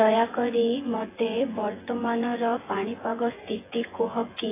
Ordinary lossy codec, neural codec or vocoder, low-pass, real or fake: AAC, 16 kbps; vocoder, 24 kHz, 100 mel bands, Vocos; 3.6 kHz; fake